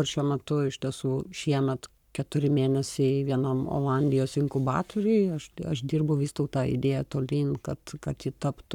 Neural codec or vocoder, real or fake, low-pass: codec, 44.1 kHz, 7.8 kbps, Pupu-Codec; fake; 19.8 kHz